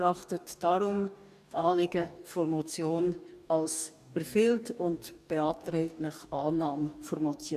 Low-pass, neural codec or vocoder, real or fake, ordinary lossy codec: 14.4 kHz; codec, 44.1 kHz, 2.6 kbps, DAC; fake; none